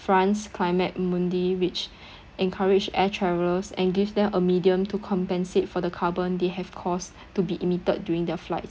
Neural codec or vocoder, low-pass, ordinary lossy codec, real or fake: none; none; none; real